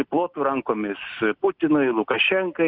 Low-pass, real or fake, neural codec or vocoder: 5.4 kHz; real; none